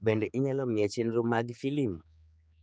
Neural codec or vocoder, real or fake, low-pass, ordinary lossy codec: codec, 16 kHz, 4 kbps, X-Codec, HuBERT features, trained on general audio; fake; none; none